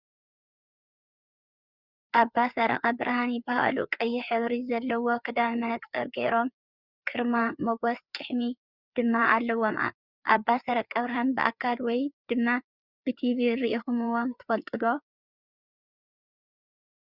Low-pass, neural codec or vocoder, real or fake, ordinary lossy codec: 5.4 kHz; codec, 16 kHz, 4 kbps, FreqCodec, larger model; fake; Opus, 64 kbps